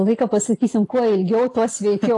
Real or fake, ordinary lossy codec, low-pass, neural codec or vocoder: real; AAC, 48 kbps; 10.8 kHz; none